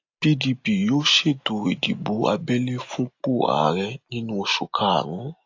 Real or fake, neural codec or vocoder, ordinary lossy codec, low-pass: real; none; AAC, 48 kbps; 7.2 kHz